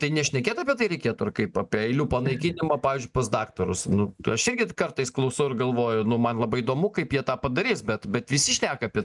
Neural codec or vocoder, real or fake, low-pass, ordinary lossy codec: none; real; 10.8 kHz; MP3, 96 kbps